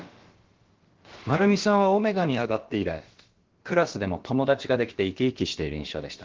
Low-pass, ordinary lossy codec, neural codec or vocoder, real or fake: 7.2 kHz; Opus, 16 kbps; codec, 16 kHz, about 1 kbps, DyCAST, with the encoder's durations; fake